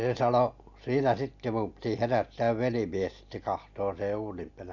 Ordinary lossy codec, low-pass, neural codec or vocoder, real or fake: none; 7.2 kHz; none; real